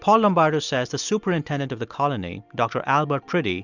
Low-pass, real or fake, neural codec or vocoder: 7.2 kHz; real; none